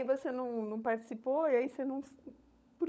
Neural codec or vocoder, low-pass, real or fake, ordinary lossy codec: codec, 16 kHz, 16 kbps, FunCodec, trained on LibriTTS, 50 frames a second; none; fake; none